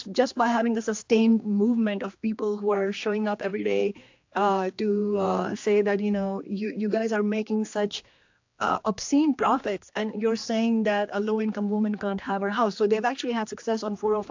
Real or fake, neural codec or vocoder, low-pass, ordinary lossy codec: fake; codec, 16 kHz, 2 kbps, X-Codec, HuBERT features, trained on general audio; 7.2 kHz; AAC, 48 kbps